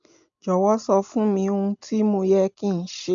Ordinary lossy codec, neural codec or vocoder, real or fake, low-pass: AAC, 64 kbps; none; real; 7.2 kHz